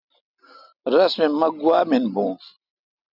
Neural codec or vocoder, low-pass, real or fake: none; 5.4 kHz; real